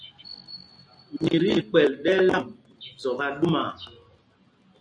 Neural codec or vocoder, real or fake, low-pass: none; real; 9.9 kHz